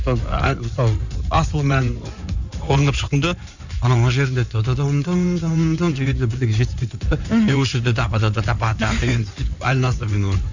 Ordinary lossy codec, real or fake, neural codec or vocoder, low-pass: none; fake; codec, 16 kHz in and 24 kHz out, 2.2 kbps, FireRedTTS-2 codec; 7.2 kHz